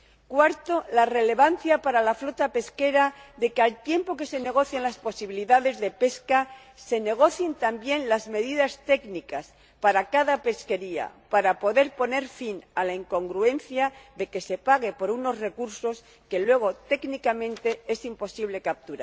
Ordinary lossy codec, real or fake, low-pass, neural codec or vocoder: none; real; none; none